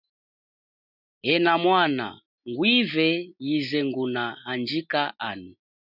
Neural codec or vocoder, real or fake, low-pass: none; real; 5.4 kHz